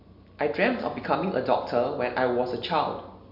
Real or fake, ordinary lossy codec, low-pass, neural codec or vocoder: real; MP3, 48 kbps; 5.4 kHz; none